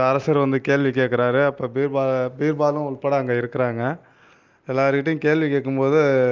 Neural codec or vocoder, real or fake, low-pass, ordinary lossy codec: none; real; 7.2 kHz; Opus, 24 kbps